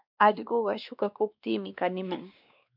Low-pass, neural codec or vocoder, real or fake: 5.4 kHz; codec, 16 kHz, 2 kbps, X-Codec, WavLM features, trained on Multilingual LibriSpeech; fake